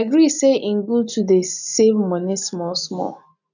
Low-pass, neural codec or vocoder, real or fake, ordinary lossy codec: 7.2 kHz; none; real; none